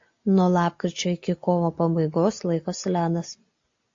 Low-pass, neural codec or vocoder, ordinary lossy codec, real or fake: 7.2 kHz; none; AAC, 48 kbps; real